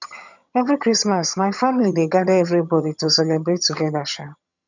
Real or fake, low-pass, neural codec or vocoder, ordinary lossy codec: fake; 7.2 kHz; vocoder, 22.05 kHz, 80 mel bands, HiFi-GAN; none